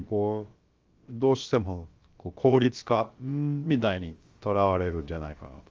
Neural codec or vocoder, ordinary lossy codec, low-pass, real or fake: codec, 16 kHz, about 1 kbps, DyCAST, with the encoder's durations; Opus, 32 kbps; 7.2 kHz; fake